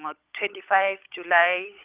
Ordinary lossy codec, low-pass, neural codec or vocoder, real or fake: Opus, 32 kbps; 3.6 kHz; codec, 16 kHz, 16 kbps, FunCodec, trained on LibriTTS, 50 frames a second; fake